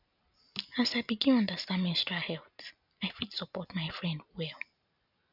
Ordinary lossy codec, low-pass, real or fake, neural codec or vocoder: none; 5.4 kHz; real; none